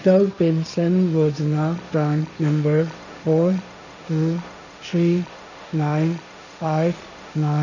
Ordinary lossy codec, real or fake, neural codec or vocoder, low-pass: none; fake; codec, 16 kHz, 1.1 kbps, Voila-Tokenizer; 7.2 kHz